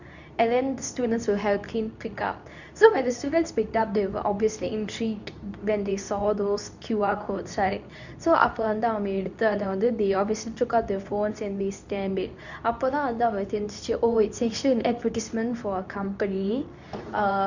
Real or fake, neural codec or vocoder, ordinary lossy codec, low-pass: fake; codec, 24 kHz, 0.9 kbps, WavTokenizer, medium speech release version 2; none; 7.2 kHz